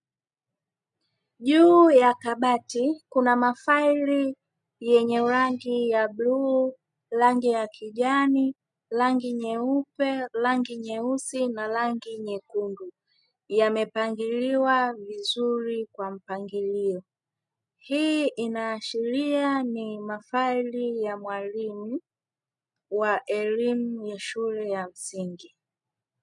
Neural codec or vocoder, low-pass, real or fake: none; 10.8 kHz; real